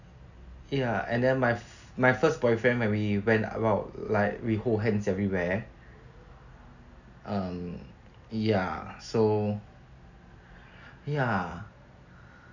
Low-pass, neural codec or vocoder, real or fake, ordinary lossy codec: 7.2 kHz; none; real; none